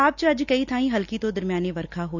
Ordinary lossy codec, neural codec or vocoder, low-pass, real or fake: none; none; 7.2 kHz; real